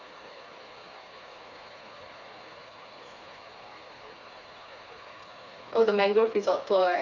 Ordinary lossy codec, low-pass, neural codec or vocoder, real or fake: none; 7.2 kHz; codec, 16 kHz, 4 kbps, FreqCodec, smaller model; fake